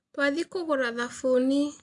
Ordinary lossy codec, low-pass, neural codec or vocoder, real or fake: MP3, 64 kbps; 10.8 kHz; none; real